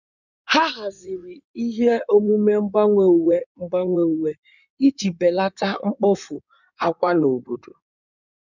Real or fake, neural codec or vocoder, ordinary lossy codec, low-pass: fake; codec, 16 kHz in and 24 kHz out, 2.2 kbps, FireRedTTS-2 codec; none; 7.2 kHz